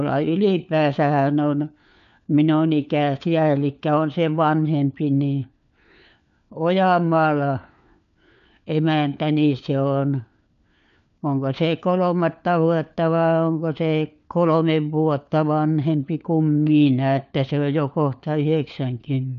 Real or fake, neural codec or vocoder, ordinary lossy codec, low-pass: fake; codec, 16 kHz, 4 kbps, FunCodec, trained on LibriTTS, 50 frames a second; none; 7.2 kHz